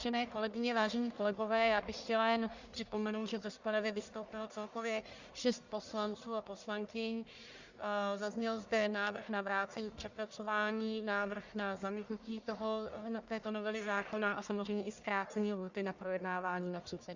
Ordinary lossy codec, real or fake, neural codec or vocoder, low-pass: Opus, 64 kbps; fake; codec, 44.1 kHz, 1.7 kbps, Pupu-Codec; 7.2 kHz